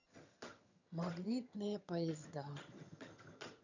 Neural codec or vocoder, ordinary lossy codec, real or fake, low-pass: vocoder, 22.05 kHz, 80 mel bands, HiFi-GAN; none; fake; 7.2 kHz